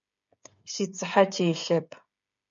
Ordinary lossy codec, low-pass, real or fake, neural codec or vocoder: MP3, 48 kbps; 7.2 kHz; fake; codec, 16 kHz, 8 kbps, FreqCodec, smaller model